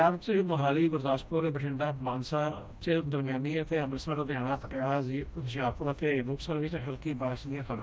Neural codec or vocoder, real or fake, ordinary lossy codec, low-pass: codec, 16 kHz, 1 kbps, FreqCodec, smaller model; fake; none; none